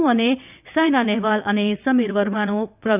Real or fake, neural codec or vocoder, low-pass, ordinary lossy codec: fake; vocoder, 22.05 kHz, 80 mel bands, WaveNeXt; 3.6 kHz; none